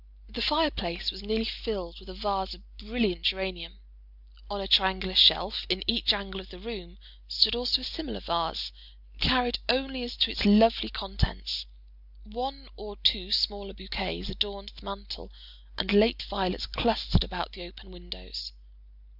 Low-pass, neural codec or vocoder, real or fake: 5.4 kHz; none; real